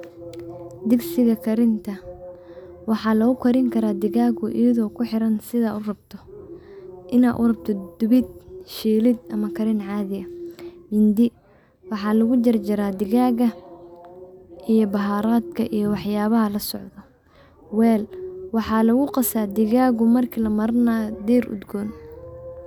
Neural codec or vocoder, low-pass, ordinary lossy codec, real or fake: none; 19.8 kHz; none; real